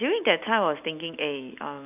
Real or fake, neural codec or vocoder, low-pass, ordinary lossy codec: real; none; 3.6 kHz; none